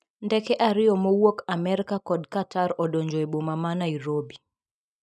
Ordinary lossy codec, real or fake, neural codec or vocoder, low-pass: none; real; none; none